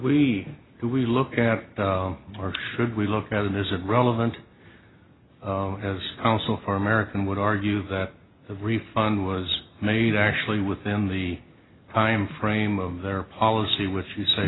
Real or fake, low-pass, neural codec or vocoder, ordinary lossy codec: real; 7.2 kHz; none; AAC, 16 kbps